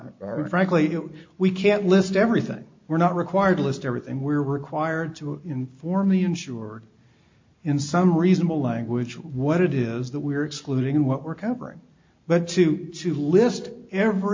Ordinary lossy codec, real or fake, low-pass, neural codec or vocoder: MP3, 48 kbps; real; 7.2 kHz; none